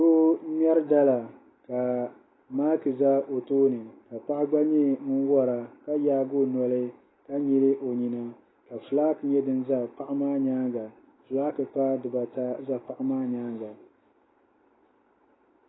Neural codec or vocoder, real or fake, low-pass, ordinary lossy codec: none; real; 7.2 kHz; AAC, 16 kbps